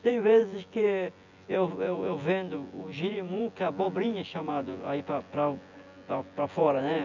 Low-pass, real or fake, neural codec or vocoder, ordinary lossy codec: 7.2 kHz; fake; vocoder, 24 kHz, 100 mel bands, Vocos; none